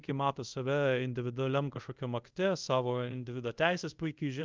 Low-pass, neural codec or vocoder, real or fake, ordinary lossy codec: 7.2 kHz; codec, 24 kHz, 0.5 kbps, DualCodec; fake; Opus, 32 kbps